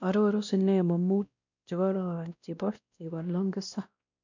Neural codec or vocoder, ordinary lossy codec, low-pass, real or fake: codec, 16 kHz, 1 kbps, X-Codec, WavLM features, trained on Multilingual LibriSpeech; none; 7.2 kHz; fake